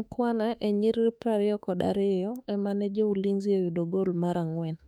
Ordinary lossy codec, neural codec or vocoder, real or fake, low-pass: none; autoencoder, 48 kHz, 32 numbers a frame, DAC-VAE, trained on Japanese speech; fake; 19.8 kHz